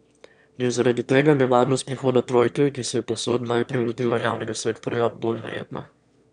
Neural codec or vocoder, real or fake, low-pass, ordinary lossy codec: autoencoder, 22.05 kHz, a latent of 192 numbers a frame, VITS, trained on one speaker; fake; 9.9 kHz; none